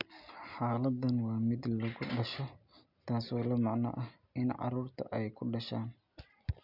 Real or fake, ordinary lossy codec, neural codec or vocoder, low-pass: real; none; none; 5.4 kHz